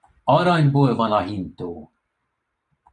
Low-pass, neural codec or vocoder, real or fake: 10.8 kHz; vocoder, 44.1 kHz, 128 mel bands every 512 samples, BigVGAN v2; fake